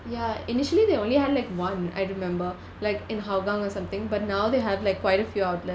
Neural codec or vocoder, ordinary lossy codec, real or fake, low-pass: none; none; real; none